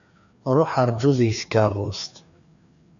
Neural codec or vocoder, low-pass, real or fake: codec, 16 kHz, 2 kbps, FreqCodec, larger model; 7.2 kHz; fake